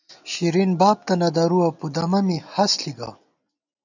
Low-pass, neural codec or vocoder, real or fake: 7.2 kHz; none; real